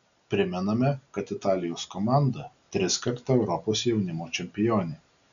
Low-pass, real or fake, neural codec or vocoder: 7.2 kHz; real; none